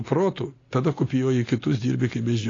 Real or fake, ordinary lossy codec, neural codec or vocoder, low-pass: real; AAC, 32 kbps; none; 7.2 kHz